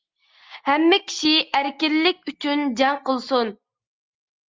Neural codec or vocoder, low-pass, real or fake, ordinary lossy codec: none; 7.2 kHz; real; Opus, 32 kbps